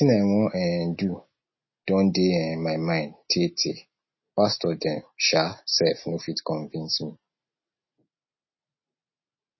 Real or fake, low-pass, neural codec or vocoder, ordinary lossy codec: real; 7.2 kHz; none; MP3, 24 kbps